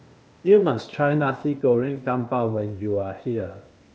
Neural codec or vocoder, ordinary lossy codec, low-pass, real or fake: codec, 16 kHz, 0.8 kbps, ZipCodec; none; none; fake